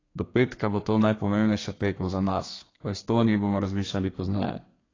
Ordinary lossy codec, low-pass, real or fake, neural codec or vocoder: AAC, 32 kbps; 7.2 kHz; fake; codec, 32 kHz, 1.9 kbps, SNAC